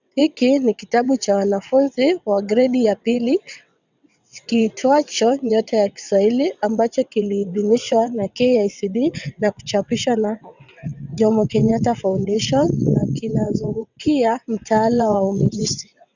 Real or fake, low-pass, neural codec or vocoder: fake; 7.2 kHz; vocoder, 22.05 kHz, 80 mel bands, WaveNeXt